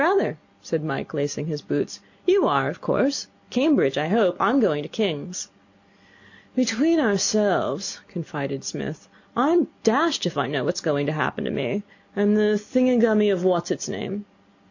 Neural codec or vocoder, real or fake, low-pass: none; real; 7.2 kHz